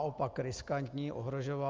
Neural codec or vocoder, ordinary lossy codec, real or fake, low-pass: none; Opus, 24 kbps; real; 7.2 kHz